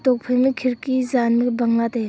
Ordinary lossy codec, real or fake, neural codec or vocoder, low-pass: none; real; none; none